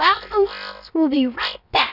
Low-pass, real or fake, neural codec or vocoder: 5.4 kHz; fake; codec, 16 kHz, about 1 kbps, DyCAST, with the encoder's durations